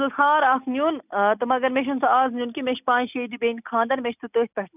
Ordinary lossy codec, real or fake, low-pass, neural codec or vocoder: none; real; 3.6 kHz; none